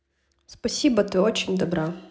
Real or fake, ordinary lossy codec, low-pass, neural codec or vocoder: real; none; none; none